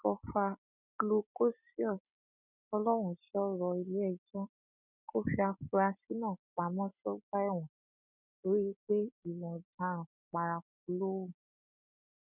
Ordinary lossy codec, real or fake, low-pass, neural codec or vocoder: none; real; 3.6 kHz; none